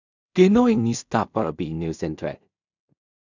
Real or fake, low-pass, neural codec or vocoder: fake; 7.2 kHz; codec, 16 kHz in and 24 kHz out, 0.4 kbps, LongCat-Audio-Codec, two codebook decoder